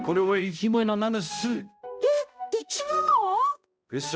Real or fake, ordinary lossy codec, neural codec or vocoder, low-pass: fake; none; codec, 16 kHz, 0.5 kbps, X-Codec, HuBERT features, trained on balanced general audio; none